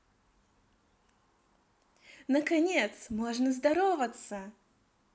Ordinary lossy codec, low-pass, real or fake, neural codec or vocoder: none; none; real; none